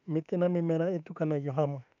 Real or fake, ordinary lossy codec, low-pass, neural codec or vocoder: fake; none; 7.2 kHz; autoencoder, 48 kHz, 32 numbers a frame, DAC-VAE, trained on Japanese speech